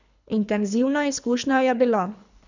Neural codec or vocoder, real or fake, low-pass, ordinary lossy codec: codec, 24 kHz, 3 kbps, HILCodec; fake; 7.2 kHz; none